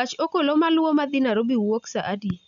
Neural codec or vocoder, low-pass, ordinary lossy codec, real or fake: none; 7.2 kHz; none; real